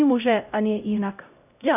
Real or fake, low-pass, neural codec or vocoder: fake; 3.6 kHz; codec, 16 kHz, 0.5 kbps, X-Codec, HuBERT features, trained on LibriSpeech